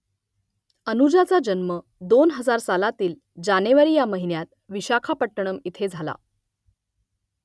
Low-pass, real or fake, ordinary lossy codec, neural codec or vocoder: none; real; none; none